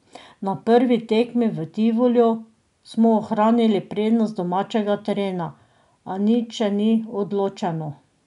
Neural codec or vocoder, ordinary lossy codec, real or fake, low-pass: none; none; real; 10.8 kHz